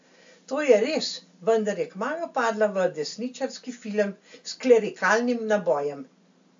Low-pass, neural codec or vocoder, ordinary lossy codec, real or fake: 7.2 kHz; none; AAC, 64 kbps; real